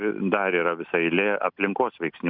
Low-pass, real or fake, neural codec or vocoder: 5.4 kHz; real; none